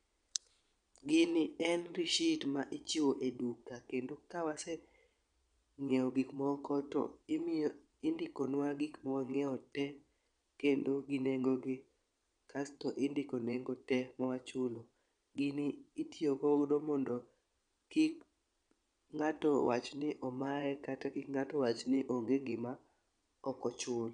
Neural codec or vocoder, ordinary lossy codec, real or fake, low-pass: vocoder, 22.05 kHz, 80 mel bands, Vocos; none; fake; 9.9 kHz